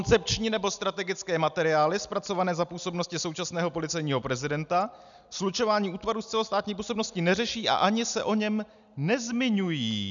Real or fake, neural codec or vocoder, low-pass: real; none; 7.2 kHz